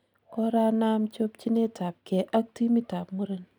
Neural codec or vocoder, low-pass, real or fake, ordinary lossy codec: none; 19.8 kHz; real; none